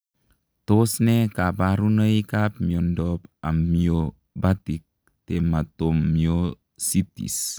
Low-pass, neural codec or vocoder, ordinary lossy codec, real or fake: none; none; none; real